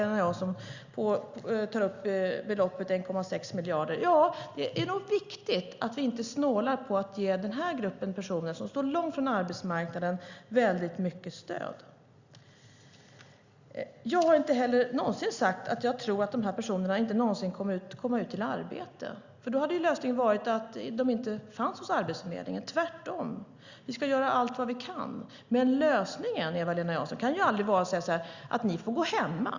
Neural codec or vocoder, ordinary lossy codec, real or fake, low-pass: none; Opus, 64 kbps; real; 7.2 kHz